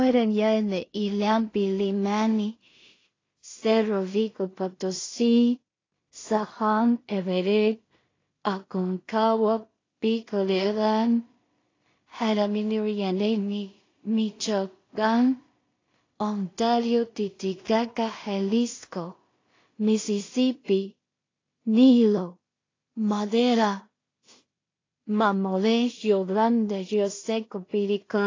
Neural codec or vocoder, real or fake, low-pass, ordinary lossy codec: codec, 16 kHz in and 24 kHz out, 0.4 kbps, LongCat-Audio-Codec, two codebook decoder; fake; 7.2 kHz; AAC, 32 kbps